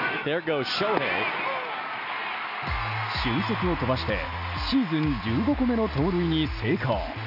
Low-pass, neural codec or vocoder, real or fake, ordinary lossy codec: 5.4 kHz; none; real; none